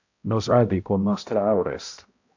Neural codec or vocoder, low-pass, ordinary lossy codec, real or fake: codec, 16 kHz, 0.5 kbps, X-Codec, HuBERT features, trained on balanced general audio; 7.2 kHz; AAC, 48 kbps; fake